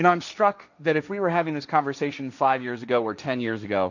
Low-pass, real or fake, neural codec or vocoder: 7.2 kHz; fake; codec, 16 kHz, 1.1 kbps, Voila-Tokenizer